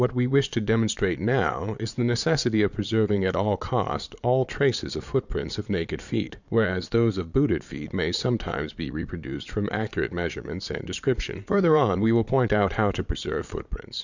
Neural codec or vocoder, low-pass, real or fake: vocoder, 22.05 kHz, 80 mel bands, Vocos; 7.2 kHz; fake